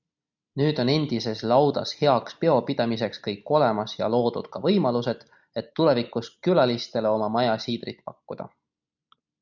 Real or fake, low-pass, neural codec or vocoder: real; 7.2 kHz; none